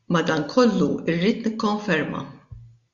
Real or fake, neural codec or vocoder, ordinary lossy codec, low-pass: real; none; Opus, 64 kbps; 7.2 kHz